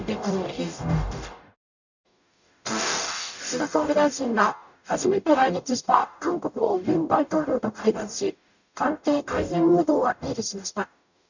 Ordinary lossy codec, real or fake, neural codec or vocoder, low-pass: none; fake; codec, 44.1 kHz, 0.9 kbps, DAC; 7.2 kHz